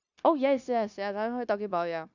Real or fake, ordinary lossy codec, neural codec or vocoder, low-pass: fake; none; codec, 16 kHz, 0.9 kbps, LongCat-Audio-Codec; 7.2 kHz